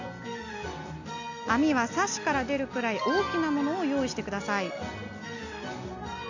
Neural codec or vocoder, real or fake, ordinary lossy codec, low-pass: none; real; none; 7.2 kHz